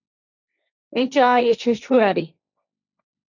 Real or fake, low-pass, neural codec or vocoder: fake; 7.2 kHz; codec, 16 kHz, 1.1 kbps, Voila-Tokenizer